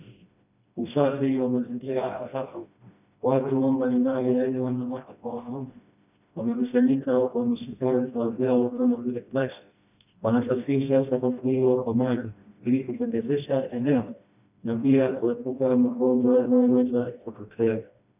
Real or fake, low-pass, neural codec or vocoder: fake; 3.6 kHz; codec, 16 kHz, 1 kbps, FreqCodec, smaller model